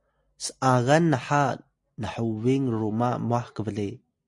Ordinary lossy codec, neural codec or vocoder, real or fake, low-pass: MP3, 48 kbps; none; real; 10.8 kHz